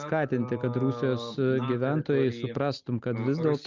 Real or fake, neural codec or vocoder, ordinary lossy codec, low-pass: real; none; Opus, 24 kbps; 7.2 kHz